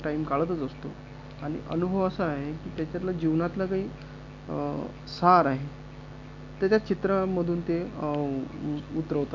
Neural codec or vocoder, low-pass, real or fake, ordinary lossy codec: none; 7.2 kHz; real; none